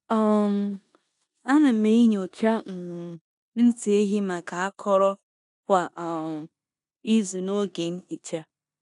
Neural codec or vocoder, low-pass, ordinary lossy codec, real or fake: codec, 16 kHz in and 24 kHz out, 0.9 kbps, LongCat-Audio-Codec, four codebook decoder; 10.8 kHz; none; fake